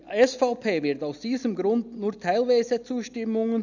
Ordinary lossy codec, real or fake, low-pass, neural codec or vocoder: none; real; 7.2 kHz; none